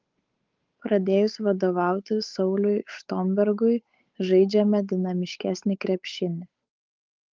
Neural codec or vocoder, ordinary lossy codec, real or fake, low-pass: codec, 16 kHz, 8 kbps, FunCodec, trained on Chinese and English, 25 frames a second; Opus, 32 kbps; fake; 7.2 kHz